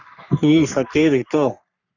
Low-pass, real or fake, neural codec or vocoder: 7.2 kHz; fake; codec, 44.1 kHz, 3.4 kbps, Pupu-Codec